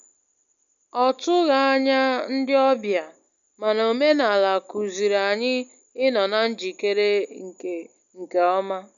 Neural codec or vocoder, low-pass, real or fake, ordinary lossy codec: none; 7.2 kHz; real; none